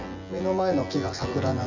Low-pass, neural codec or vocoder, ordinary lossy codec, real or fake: 7.2 kHz; vocoder, 24 kHz, 100 mel bands, Vocos; none; fake